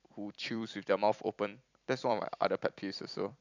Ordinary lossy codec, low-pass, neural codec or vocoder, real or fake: none; 7.2 kHz; vocoder, 44.1 kHz, 128 mel bands every 256 samples, BigVGAN v2; fake